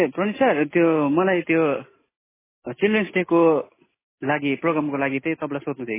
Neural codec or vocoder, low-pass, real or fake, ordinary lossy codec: none; 3.6 kHz; real; MP3, 16 kbps